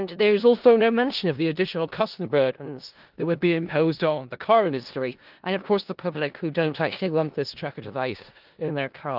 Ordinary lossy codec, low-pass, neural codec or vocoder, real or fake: Opus, 32 kbps; 5.4 kHz; codec, 16 kHz in and 24 kHz out, 0.4 kbps, LongCat-Audio-Codec, four codebook decoder; fake